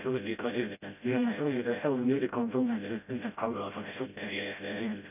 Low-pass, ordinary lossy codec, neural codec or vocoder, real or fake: 3.6 kHz; none; codec, 16 kHz, 0.5 kbps, FreqCodec, smaller model; fake